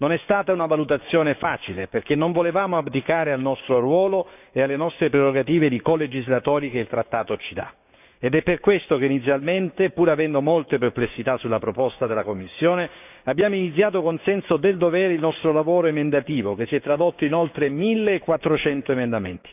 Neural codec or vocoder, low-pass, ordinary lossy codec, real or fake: codec, 16 kHz, 6 kbps, DAC; 3.6 kHz; none; fake